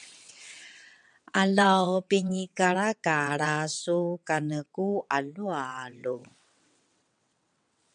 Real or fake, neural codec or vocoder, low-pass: fake; vocoder, 22.05 kHz, 80 mel bands, WaveNeXt; 9.9 kHz